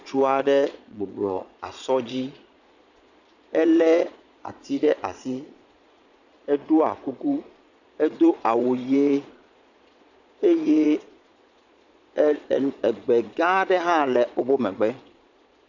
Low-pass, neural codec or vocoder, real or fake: 7.2 kHz; vocoder, 22.05 kHz, 80 mel bands, Vocos; fake